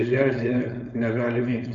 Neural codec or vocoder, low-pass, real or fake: codec, 16 kHz, 4.8 kbps, FACodec; 7.2 kHz; fake